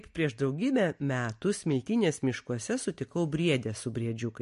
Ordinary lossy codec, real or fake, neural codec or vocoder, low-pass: MP3, 48 kbps; real; none; 14.4 kHz